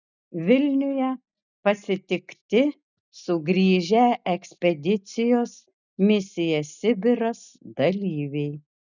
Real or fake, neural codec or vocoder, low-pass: real; none; 7.2 kHz